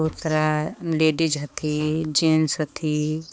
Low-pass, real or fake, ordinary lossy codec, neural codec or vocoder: none; fake; none; codec, 16 kHz, 4 kbps, X-Codec, HuBERT features, trained on balanced general audio